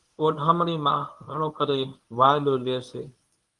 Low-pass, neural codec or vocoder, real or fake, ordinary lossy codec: 10.8 kHz; codec, 24 kHz, 0.9 kbps, WavTokenizer, medium speech release version 1; fake; Opus, 32 kbps